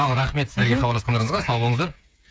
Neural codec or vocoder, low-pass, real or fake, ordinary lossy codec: codec, 16 kHz, 8 kbps, FreqCodec, smaller model; none; fake; none